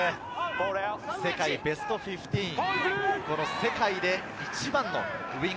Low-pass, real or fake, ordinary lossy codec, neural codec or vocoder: none; real; none; none